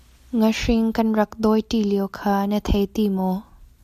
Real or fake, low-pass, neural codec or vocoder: real; 14.4 kHz; none